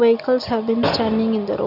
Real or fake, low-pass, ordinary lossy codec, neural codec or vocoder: real; 5.4 kHz; none; none